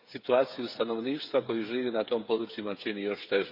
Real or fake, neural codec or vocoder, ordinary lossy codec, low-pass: fake; codec, 16 kHz, 8 kbps, FreqCodec, smaller model; Opus, 64 kbps; 5.4 kHz